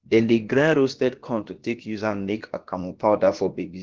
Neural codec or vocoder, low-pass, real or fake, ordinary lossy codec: codec, 16 kHz, about 1 kbps, DyCAST, with the encoder's durations; 7.2 kHz; fake; Opus, 16 kbps